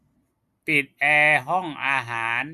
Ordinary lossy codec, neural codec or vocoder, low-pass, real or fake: Opus, 64 kbps; none; 14.4 kHz; real